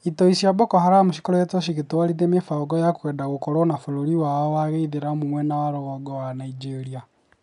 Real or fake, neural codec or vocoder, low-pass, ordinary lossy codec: real; none; 10.8 kHz; none